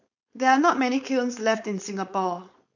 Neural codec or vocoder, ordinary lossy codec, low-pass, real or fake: codec, 16 kHz, 4.8 kbps, FACodec; none; 7.2 kHz; fake